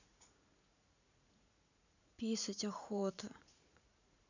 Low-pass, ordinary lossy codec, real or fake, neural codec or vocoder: 7.2 kHz; none; fake; vocoder, 44.1 kHz, 80 mel bands, Vocos